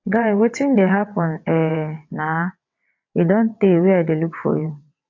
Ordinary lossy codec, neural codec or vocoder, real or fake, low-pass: AAC, 48 kbps; vocoder, 22.05 kHz, 80 mel bands, WaveNeXt; fake; 7.2 kHz